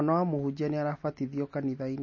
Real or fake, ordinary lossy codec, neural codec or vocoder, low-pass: real; MP3, 32 kbps; none; 7.2 kHz